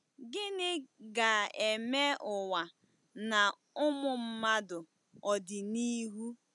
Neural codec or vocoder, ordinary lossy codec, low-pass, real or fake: none; none; 10.8 kHz; real